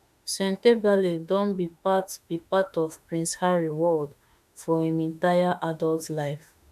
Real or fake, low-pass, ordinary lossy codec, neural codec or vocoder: fake; 14.4 kHz; none; autoencoder, 48 kHz, 32 numbers a frame, DAC-VAE, trained on Japanese speech